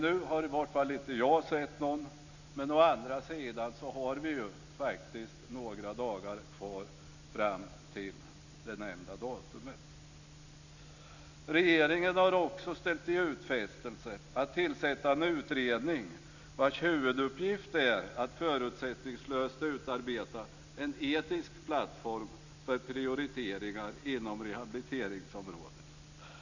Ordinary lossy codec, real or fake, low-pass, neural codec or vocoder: none; real; 7.2 kHz; none